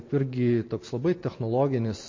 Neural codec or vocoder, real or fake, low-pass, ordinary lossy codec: none; real; 7.2 kHz; MP3, 32 kbps